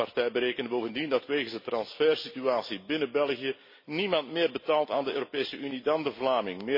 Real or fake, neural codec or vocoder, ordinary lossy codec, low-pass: real; none; MP3, 24 kbps; 5.4 kHz